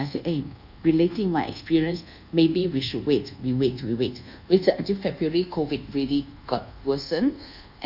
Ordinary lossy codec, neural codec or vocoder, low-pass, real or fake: none; codec, 24 kHz, 1.2 kbps, DualCodec; 5.4 kHz; fake